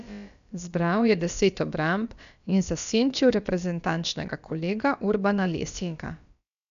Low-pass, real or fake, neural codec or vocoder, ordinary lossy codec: 7.2 kHz; fake; codec, 16 kHz, about 1 kbps, DyCAST, with the encoder's durations; none